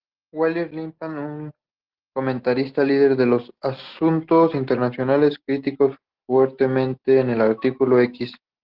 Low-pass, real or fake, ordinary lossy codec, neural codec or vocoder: 5.4 kHz; real; Opus, 16 kbps; none